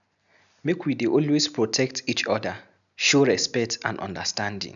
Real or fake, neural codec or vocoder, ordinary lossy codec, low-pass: real; none; none; 7.2 kHz